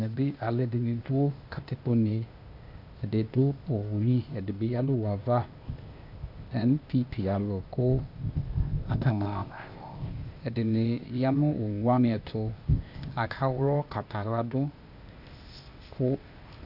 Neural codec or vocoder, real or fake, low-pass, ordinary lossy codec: codec, 16 kHz, 0.8 kbps, ZipCodec; fake; 5.4 kHz; Opus, 64 kbps